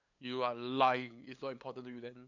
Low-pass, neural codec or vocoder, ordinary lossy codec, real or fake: 7.2 kHz; codec, 16 kHz, 8 kbps, FunCodec, trained on LibriTTS, 25 frames a second; none; fake